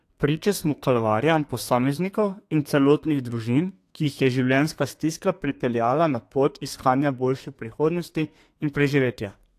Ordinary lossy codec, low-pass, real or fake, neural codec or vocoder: AAC, 64 kbps; 14.4 kHz; fake; codec, 32 kHz, 1.9 kbps, SNAC